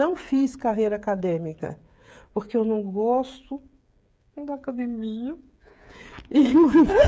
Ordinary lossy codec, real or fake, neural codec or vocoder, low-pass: none; fake; codec, 16 kHz, 8 kbps, FreqCodec, smaller model; none